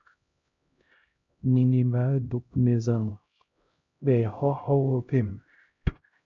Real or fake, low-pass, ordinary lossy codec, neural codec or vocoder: fake; 7.2 kHz; MP3, 48 kbps; codec, 16 kHz, 0.5 kbps, X-Codec, HuBERT features, trained on LibriSpeech